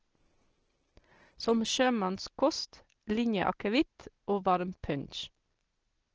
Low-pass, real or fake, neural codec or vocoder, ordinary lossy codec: 7.2 kHz; real; none; Opus, 16 kbps